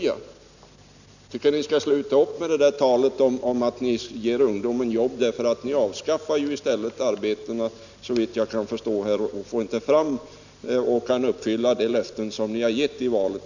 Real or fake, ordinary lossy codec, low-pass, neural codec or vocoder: real; none; 7.2 kHz; none